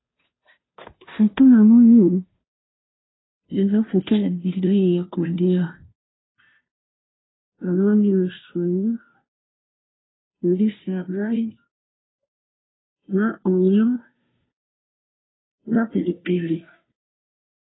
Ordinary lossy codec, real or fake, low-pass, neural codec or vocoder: AAC, 16 kbps; fake; 7.2 kHz; codec, 16 kHz, 0.5 kbps, FunCodec, trained on Chinese and English, 25 frames a second